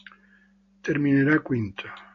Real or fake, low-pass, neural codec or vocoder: real; 7.2 kHz; none